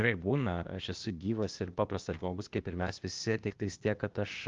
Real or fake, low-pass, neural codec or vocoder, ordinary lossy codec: fake; 7.2 kHz; codec, 16 kHz, 0.8 kbps, ZipCodec; Opus, 32 kbps